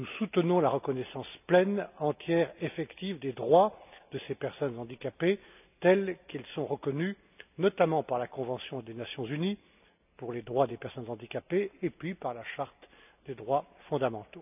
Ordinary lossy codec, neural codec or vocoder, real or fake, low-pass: none; none; real; 3.6 kHz